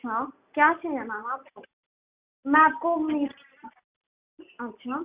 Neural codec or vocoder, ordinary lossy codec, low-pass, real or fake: none; Opus, 64 kbps; 3.6 kHz; real